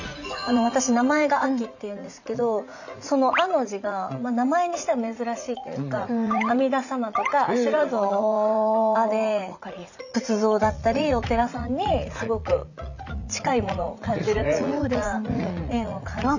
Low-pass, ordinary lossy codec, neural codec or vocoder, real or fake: 7.2 kHz; none; vocoder, 44.1 kHz, 80 mel bands, Vocos; fake